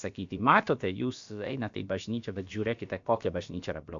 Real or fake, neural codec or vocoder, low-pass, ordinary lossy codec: fake; codec, 16 kHz, about 1 kbps, DyCAST, with the encoder's durations; 7.2 kHz; MP3, 64 kbps